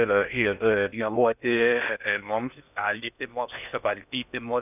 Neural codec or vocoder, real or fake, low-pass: codec, 16 kHz in and 24 kHz out, 0.6 kbps, FocalCodec, streaming, 4096 codes; fake; 3.6 kHz